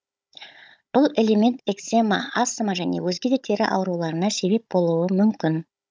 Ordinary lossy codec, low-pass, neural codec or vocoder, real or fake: none; none; codec, 16 kHz, 16 kbps, FunCodec, trained on Chinese and English, 50 frames a second; fake